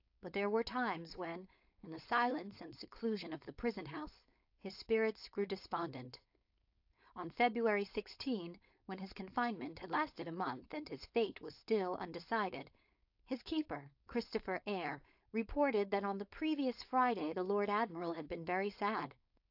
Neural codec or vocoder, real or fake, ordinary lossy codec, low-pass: codec, 16 kHz, 4.8 kbps, FACodec; fake; AAC, 48 kbps; 5.4 kHz